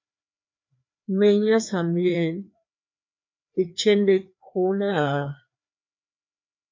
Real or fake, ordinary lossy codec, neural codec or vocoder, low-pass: fake; MP3, 64 kbps; codec, 16 kHz, 2 kbps, FreqCodec, larger model; 7.2 kHz